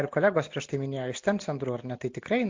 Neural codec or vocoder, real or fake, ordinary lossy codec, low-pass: none; real; MP3, 48 kbps; 7.2 kHz